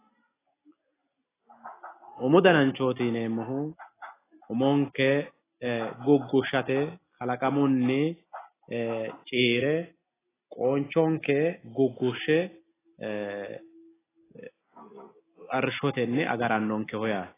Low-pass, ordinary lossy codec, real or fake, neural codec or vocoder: 3.6 kHz; AAC, 16 kbps; real; none